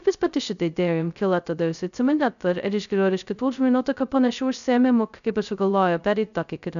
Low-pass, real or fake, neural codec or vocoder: 7.2 kHz; fake; codec, 16 kHz, 0.2 kbps, FocalCodec